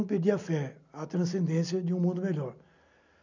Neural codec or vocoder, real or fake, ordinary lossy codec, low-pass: none; real; none; 7.2 kHz